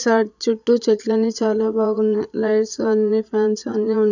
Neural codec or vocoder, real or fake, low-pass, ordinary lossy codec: vocoder, 22.05 kHz, 80 mel bands, Vocos; fake; 7.2 kHz; none